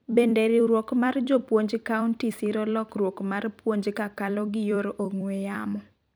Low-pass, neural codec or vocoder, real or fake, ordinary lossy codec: none; vocoder, 44.1 kHz, 128 mel bands every 256 samples, BigVGAN v2; fake; none